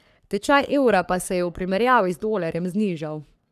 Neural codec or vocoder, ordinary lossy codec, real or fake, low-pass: codec, 44.1 kHz, 3.4 kbps, Pupu-Codec; none; fake; 14.4 kHz